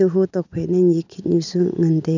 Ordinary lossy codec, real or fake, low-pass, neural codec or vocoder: none; real; 7.2 kHz; none